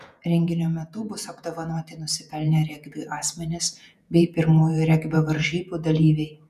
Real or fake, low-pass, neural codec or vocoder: fake; 14.4 kHz; vocoder, 48 kHz, 128 mel bands, Vocos